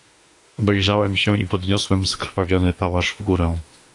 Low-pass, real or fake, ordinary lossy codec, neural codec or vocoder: 10.8 kHz; fake; AAC, 48 kbps; autoencoder, 48 kHz, 32 numbers a frame, DAC-VAE, trained on Japanese speech